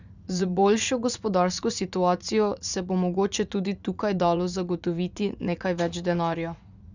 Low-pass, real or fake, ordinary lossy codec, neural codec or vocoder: 7.2 kHz; fake; none; vocoder, 44.1 kHz, 80 mel bands, Vocos